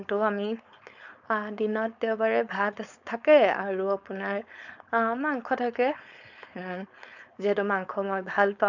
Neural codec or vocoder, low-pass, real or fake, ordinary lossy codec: codec, 16 kHz, 4.8 kbps, FACodec; 7.2 kHz; fake; none